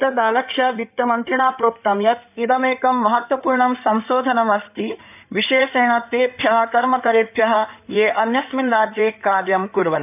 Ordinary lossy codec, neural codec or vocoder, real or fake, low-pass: none; codec, 16 kHz in and 24 kHz out, 2.2 kbps, FireRedTTS-2 codec; fake; 3.6 kHz